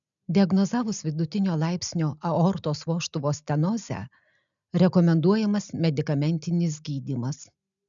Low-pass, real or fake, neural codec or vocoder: 7.2 kHz; real; none